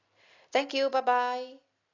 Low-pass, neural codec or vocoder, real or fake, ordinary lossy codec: 7.2 kHz; none; real; AAC, 32 kbps